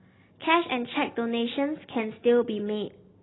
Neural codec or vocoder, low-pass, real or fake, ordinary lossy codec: none; 7.2 kHz; real; AAC, 16 kbps